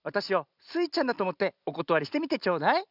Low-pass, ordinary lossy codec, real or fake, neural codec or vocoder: 5.4 kHz; none; real; none